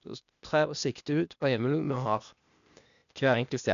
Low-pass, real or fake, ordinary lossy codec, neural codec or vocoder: 7.2 kHz; fake; none; codec, 16 kHz, 0.8 kbps, ZipCodec